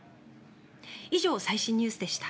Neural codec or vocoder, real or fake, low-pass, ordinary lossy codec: none; real; none; none